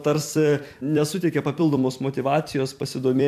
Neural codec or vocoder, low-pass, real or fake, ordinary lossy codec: vocoder, 44.1 kHz, 128 mel bands every 256 samples, BigVGAN v2; 14.4 kHz; fake; MP3, 96 kbps